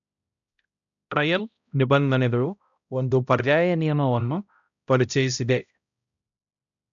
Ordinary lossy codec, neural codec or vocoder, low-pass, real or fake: none; codec, 16 kHz, 0.5 kbps, X-Codec, HuBERT features, trained on balanced general audio; 7.2 kHz; fake